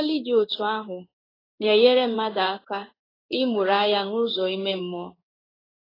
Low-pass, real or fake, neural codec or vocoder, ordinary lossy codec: 5.4 kHz; fake; codec, 16 kHz in and 24 kHz out, 1 kbps, XY-Tokenizer; AAC, 24 kbps